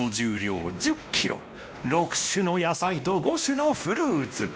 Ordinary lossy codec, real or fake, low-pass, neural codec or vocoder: none; fake; none; codec, 16 kHz, 1 kbps, X-Codec, WavLM features, trained on Multilingual LibriSpeech